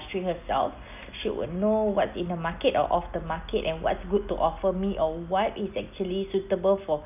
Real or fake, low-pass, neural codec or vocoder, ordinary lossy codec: real; 3.6 kHz; none; none